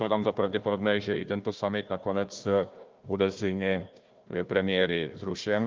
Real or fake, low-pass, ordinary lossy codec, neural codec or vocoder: fake; 7.2 kHz; Opus, 24 kbps; codec, 16 kHz, 1 kbps, FunCodec, trained on Chinese and English, 50 frames a second